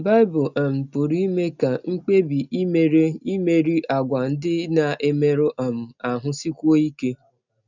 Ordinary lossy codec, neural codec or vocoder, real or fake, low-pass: none; none; real; 7.2 kHz